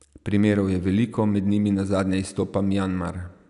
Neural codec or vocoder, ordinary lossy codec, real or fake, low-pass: none; none; real; 10.8 kHz